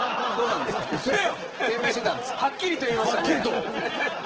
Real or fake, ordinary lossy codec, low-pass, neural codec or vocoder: real; Opus, 16 kbps; 7.2 kHz; none